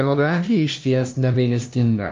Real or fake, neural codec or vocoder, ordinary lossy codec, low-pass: fake; codec, 16 kHz, 0.5 kbps, FunCodec, trained on LibriTTS, 25 frames a second; Opus, 24 kbps; 7.2 kHz